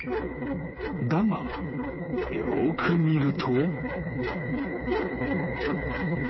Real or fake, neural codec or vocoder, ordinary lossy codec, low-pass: fake; codec, 16 kHz, 4 kbps, FreqCodec, smaller model; MP3, 24 kbps; 7.2 kHz